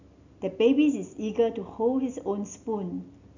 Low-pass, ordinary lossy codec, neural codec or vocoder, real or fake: 7.2 kHz; none; none; real